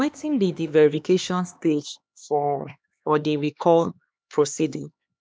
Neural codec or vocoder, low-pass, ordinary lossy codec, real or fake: codec, 16 kHz, 2 kbps, X-Codec, HuBERT features, trained on LibriSpeech; none; none; fake